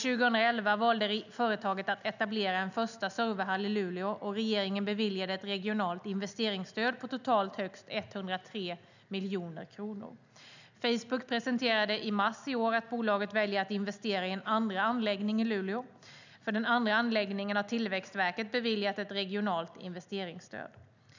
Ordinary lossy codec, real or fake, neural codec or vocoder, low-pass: none; real; none; 7.2 kHz